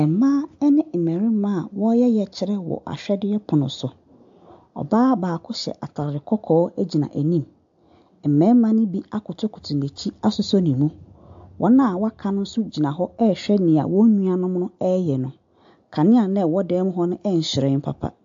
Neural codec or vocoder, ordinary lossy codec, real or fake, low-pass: none; AAC, 64 kbps; real; 7.2 kHz